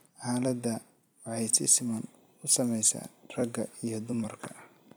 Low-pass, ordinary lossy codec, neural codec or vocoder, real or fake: none; none; vocoder, 44.1 kHz, 128 mel bands every 256 samples, BigVGAN v2; fake